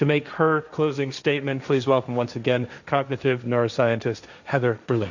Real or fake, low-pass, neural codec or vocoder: fake; 7.2 kHz; codec, 16 kHz, 1.1 kbps, Voila-Tokenizer